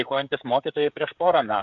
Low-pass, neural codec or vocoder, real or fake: 7.2 kHz; codec, 16 kHz, 16 kbps, FreqCodec, smaller model; fake